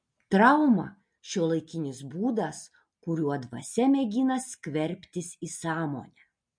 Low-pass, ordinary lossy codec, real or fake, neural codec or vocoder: 9.9 kHz; MP3, 48 kbps; real; none